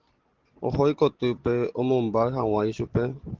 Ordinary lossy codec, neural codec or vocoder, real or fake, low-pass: Opus, 16 kbps; none; real; 7.2 kHz